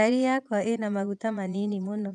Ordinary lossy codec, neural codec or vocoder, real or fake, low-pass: none; vocoder, 22.05 kHz, 80 mel bands, Vocos; fake; 9.9 kHz